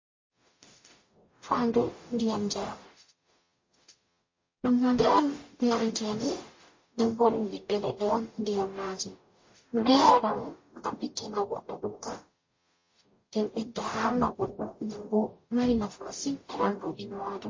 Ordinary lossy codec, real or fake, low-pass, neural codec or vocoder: MP3, 32 kbps; fake; 7.2 kHz; codec, 44.1 kHz, 0.9 kbps, DAC